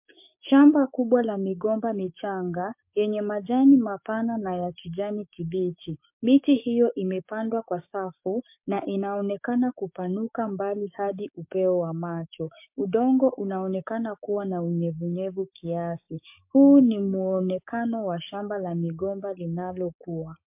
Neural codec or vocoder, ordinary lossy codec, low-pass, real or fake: codec, 16 kHz, 6 kbps, DAC; MP3, 32 kbps; 3.6 kHz; fake